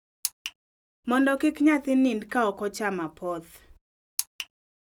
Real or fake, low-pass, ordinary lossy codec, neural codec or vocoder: real; 19.8 kHz; none; none